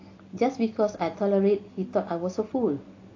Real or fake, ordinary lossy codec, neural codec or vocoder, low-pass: real; AAC, 32 kbps; none; 7.2 kHz